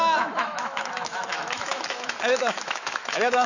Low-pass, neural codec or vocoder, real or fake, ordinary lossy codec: 7.2 kHz; autoencoder, 48 kHz, 128 numbers a frame, DAC-VAE, trained on Japanese speech; fake; none